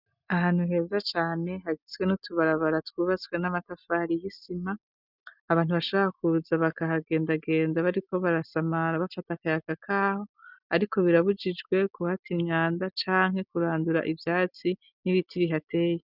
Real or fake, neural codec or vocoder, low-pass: real; none; 5.4 kHz